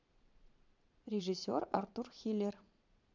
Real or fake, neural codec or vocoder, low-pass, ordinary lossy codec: fake; vocoder, 22.05 kHz, 80 mel bands, Vocos; 7.2 kHz; MP3, 64 kbps